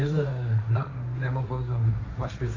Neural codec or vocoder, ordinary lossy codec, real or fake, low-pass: codec, 16 kHz, 1.1 kbps, Voila-Tokenizer; AAC, 32 kbps; fake; 7.2 kHz